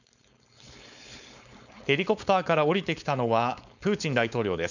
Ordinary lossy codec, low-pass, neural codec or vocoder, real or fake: none; 7.2 kHz; codec, 16 kHz, 4.8 kbps, FACodec; fake